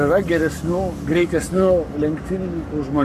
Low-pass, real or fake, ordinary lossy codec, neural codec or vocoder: 14.4 kHz; fake; AAC, 64 kbps; codec, 44.1 kHz, 7.8 kbps, Pupu-Codec